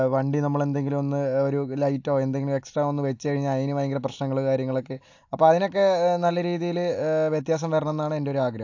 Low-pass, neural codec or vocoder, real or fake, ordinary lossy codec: 7.2 kHz; none; real; AAC, 48 kbps